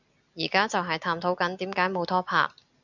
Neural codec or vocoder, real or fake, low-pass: none; real; 7.2 kHz